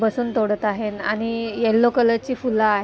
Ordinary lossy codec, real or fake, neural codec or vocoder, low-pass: none; real; none; none